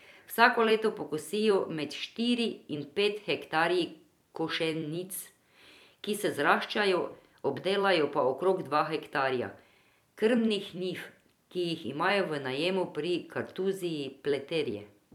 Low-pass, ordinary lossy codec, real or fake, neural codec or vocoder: 19.8 kHz; none; fake; vocoder, 44.1 kHz, 128 mel bands every 256 samples, BigVGAN v2